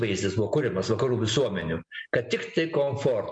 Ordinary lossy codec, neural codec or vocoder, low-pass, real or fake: MP3, 64 kbps; none; 9.9 kHz; real